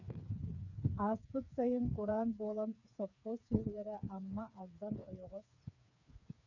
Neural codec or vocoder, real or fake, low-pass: codec, 16 kHz, 4 kbps, FreqCodec, smaller model; fake; 7.2 kHz